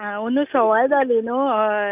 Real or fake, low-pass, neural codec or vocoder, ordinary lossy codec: real; 3.6 kHz; none; none